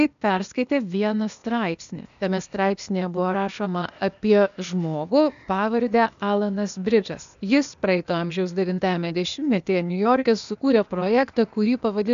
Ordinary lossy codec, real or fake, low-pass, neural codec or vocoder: AAC, 96 kbps; fake; 7.2 kHz; codec, 16 kHz, 0.8 kbps, ZipCodec